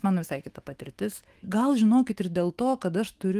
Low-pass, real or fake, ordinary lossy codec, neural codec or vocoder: 14.4 kHz; fake; Opus, 24 kbps; autoencoder, 48 kHz, 128 numbers a frame, DAC-VAE, trained on Japanese speech